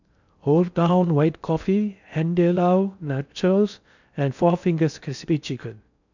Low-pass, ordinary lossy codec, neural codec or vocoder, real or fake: 7.2 kHz; none; codec, 16 kHz in and 24 kHz out, 0.6 kbps, FocalCodec, streaming, 4096 codes; fake